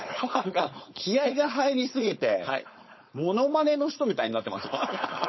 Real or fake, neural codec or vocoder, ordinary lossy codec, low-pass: fake; codec, 16 kHz, 4.8 kbps, FACodec; MP3, 24 kbps; 7.2 kHz